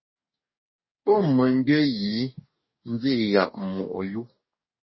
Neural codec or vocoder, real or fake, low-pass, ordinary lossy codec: codec, 44.1 kHz, 2.6 kbps, DAC; fake; 7.2 kHz; MP3, 24 kbps